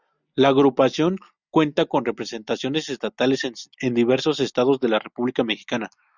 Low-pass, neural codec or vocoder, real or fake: 7.2 kHz; none; real